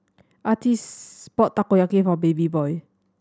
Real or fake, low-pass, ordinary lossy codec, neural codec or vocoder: real; none; none; none